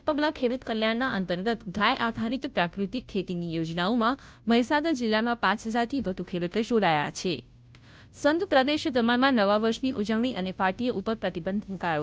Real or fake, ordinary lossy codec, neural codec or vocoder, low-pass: fake; none; codec, 16 kHz, 0.5 kbps, FunCodec, trained on Chinese and English, 25 frames a second; none